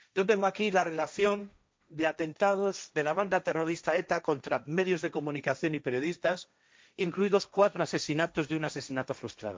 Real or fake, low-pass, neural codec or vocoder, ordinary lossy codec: fake; none; codec, 16 kHz, 1.1 kbps, Voila-Tokenizer; none